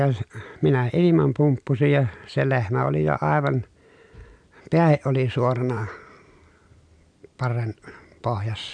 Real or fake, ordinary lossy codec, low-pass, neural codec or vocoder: real; none; 9.9 kHz; none